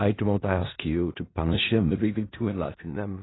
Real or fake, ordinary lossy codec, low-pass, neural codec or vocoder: fake; AAC, 16 kbps; 7.2 kHz; codec, 16 kHz in and 24 kHz out, 0.4 kbps, LongCat-Audio-Codec, four codebook decoder